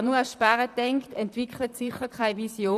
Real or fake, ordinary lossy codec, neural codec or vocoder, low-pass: fake; none; vocoder, 44.1 kHz, 128 mel bands, Pupu-Vocoder; 14.4 kHz